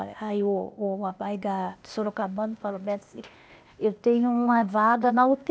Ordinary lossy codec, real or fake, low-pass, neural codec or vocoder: none; fake; none; codec, 16 kHz, 0.8 kbps, ZipCodec